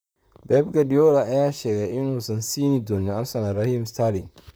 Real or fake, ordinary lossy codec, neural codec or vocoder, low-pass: fake; none; vocoder, 44.1 kHz, 128 mel bands, Pupu-Vocoder; none